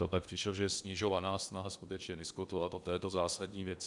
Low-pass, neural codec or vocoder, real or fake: 10.8 kHz; codec, 16 kHz in and 24 kHz out, 0.8 kbps, FocalCodec, streaming, 65536 codes; fake